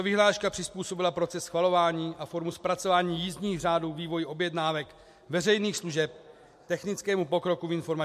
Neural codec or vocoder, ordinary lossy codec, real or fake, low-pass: none; MP3, 64 kbps; real; 14.4 kHz